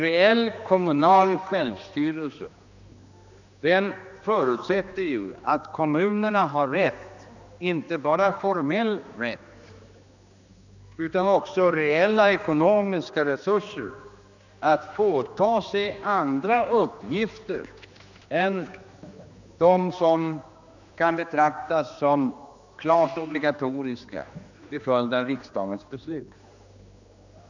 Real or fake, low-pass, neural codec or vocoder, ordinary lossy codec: fake; 7.2 kHz; codec, 16 kHz, 2 kbps, X-Codec, HuBERT features, trained on general audio; none